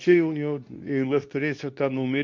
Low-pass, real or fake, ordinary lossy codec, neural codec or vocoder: 7.2 kHz; fake; MP3, 48 kbps; codec, 24 kHz, 0.9 kbps, WavTokenizer, medium speech release version 2